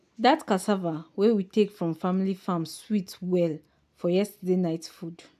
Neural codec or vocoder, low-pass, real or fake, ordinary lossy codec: none; 14.4 kHz; real; AAC, 96 kbps